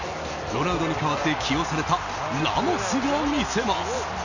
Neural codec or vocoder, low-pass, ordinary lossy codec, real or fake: none; 7.2 kHz; none; real